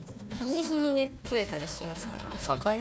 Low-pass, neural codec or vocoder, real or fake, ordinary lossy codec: none; codec, 16 kHz, 1 kbps, FunCodec, trained on Chinese and English, 50 frames a second; fake; none